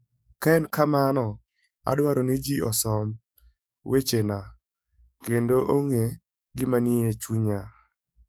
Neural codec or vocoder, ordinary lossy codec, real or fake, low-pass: codec, 44.1 kHz, 7.8 kbps, DAC; none; fake; none